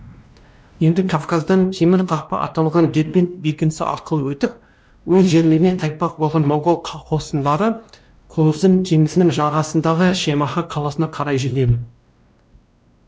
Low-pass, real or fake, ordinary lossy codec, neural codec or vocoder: none; fake; none; codec, 16 kHz, 1 kbps, X-Codec, WavLM features, trained on Multilingual LibriSpeech